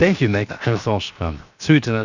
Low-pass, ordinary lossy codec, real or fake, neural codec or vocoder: 7.2 kHz; none; fake; codec, 16 kHz, 0.7 kbps, FocalCodec